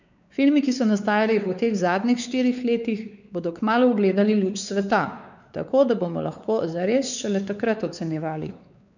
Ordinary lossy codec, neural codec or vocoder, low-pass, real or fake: none; codec, 16 kHz, 4 kbps, X-Codec, WavLM features, trained on Multilingual LibriSpeech; 7.2 kHz; fake